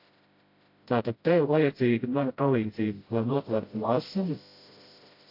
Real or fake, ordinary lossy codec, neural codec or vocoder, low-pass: fake; AAC, 32 kbps; codec, 16 kHz, 0.5 kbps, FreqCodec, smaller model; 5.4 kHz